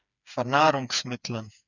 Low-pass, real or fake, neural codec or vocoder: 7.2 kHz; fake; codec, 16 kHz, 4 kbps, FreqCodec, smaller model